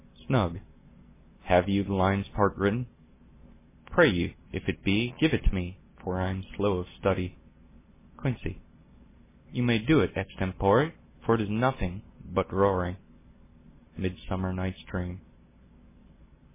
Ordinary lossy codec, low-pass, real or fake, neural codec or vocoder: MP3, 16 kbps; 3.6 kHz; real; none